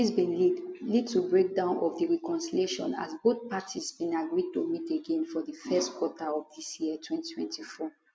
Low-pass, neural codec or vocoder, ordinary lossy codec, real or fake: none; none; none; real